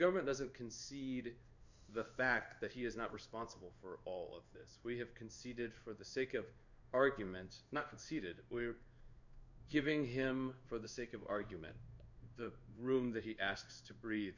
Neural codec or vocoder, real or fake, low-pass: codec, 16 kHz in and 24 kHz out, 1 kbps, XY-Tokenizer; fake; 7.2 kHz